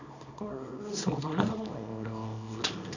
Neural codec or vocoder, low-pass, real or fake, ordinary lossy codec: codec, 24 kHz, 0.9 kbps, WavTokenizer, small release; 7.2 kHz; fake; none